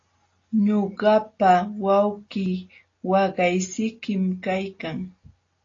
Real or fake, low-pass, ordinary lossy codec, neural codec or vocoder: real; 7.2 kHz; AAC, 32 kbps; none